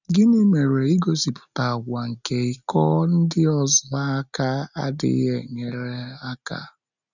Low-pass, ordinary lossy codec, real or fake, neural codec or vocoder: 7.2 kHz; none; real; none